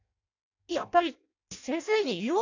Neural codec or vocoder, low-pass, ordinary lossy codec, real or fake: codec, 16 kHz in and 24 kHz out, 0.6 kbps, FireRedTTS-2 codec; 7.2 kHz; AAC, 48 kbps; fake